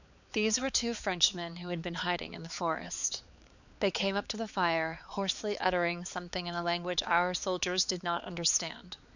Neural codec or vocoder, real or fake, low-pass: codec, 16 kHz, 4 kbps, X-Codec, HuBERT features, trained on balanced general audio; fake; 7.2 kHz